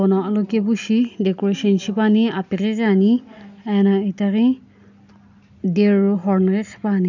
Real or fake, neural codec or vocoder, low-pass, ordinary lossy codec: real; none; 7.2 kHz; none